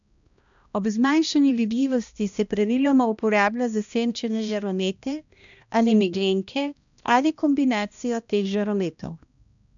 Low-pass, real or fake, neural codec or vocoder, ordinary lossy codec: 7.2 kHz; fake; codec, 16 kHz, 1 kbps, X-Codec, HuBERT features, trained on balanced general audio; none